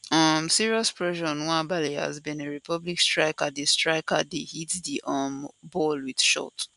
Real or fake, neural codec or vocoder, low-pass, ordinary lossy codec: real; none; 10.8 kHz; AAC, 96 kbps